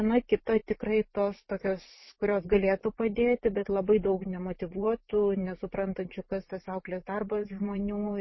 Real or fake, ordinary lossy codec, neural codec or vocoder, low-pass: fake; MP3, 24 kbps; vocoder, 44.1 kHz, 128 mel bands every 512 samples, BigVGAN v2; 7.2 kHz